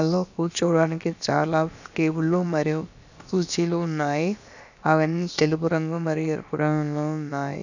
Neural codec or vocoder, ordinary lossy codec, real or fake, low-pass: codec, 16 kHz, about 1 kbps, DyCAST, with the encoder's durations; none; fake; 7.2 kHz